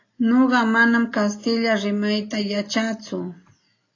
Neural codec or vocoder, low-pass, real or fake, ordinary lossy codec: none; 7.2 kHz; real; AAC, 32 kbps